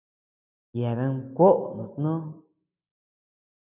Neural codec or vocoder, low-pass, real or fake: none; 3.6 kHz; real